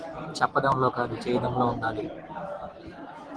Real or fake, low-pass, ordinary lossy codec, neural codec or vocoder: real; 10.8 kHz; Opus, 16 kbps; none